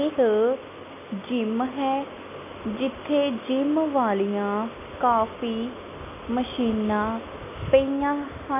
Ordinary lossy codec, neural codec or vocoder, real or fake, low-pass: none; none; real; 3.6 kHz